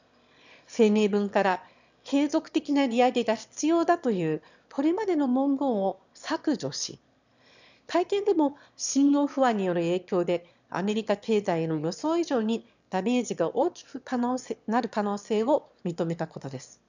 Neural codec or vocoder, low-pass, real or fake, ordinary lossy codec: autoencoder, 22.05 kHz, a latent of 192 numbers a frame, VITS, trained on one speaker; 7.2 kHz; fake; none